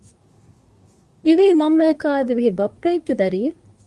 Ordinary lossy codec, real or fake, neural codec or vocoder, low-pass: Opus, 32 kbps; fake; codec, 24 kHz, 1 kbps, SNAC; 10.8 kHz